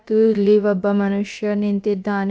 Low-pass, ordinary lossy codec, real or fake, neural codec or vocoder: none; none; fake; codec, 16 kHz, about 1 kbps, DyCAST, with the encoder's durations